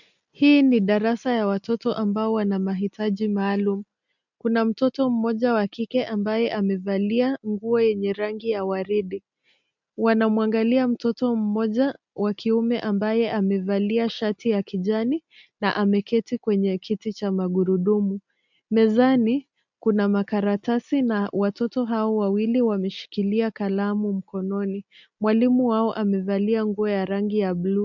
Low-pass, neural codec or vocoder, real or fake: 7.2 kHz; none; real